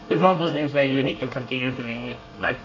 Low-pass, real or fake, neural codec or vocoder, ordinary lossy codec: 7.2 kHz; fake; codec, 24 kHz, 1 kbps, SNAC; MP3, 48 kbps